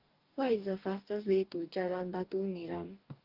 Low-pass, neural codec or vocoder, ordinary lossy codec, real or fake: 5.4 kHz; codec, 44.1 kHz, 2.6 kbps, DAC; Opus, 32 kbps; fake